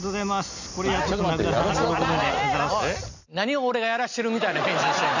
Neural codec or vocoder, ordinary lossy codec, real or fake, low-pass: none; none; real; 7.2 kHz